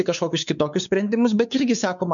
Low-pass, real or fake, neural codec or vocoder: 7.2 kHz; fake; codec, 16 kHz, 4 kbps, X-Codec, WavLM features, trained on Multilingual LibriSpeech